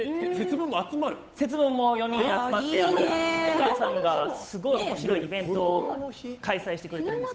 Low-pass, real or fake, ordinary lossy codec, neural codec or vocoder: none; fake; none; codec, 16 kHz, 8 kbps, FunCodec, trained on Chinese and English, 25 frames a second